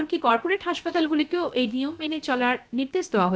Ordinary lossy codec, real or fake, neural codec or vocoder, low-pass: none; fake; codec, 16 kHz, about 1 kbps, DyCAST, with the encoder's durations; none